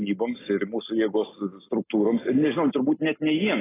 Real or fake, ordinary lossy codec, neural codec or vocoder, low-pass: real; AAC, 16 kbps; none; 3.6 kHz